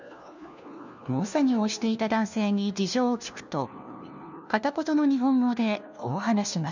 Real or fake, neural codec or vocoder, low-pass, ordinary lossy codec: fake; codec, 16 kHz, 1 kbps, FunCodec, trained on LibriTTS, 50 frames a second; 7.2 kHz; none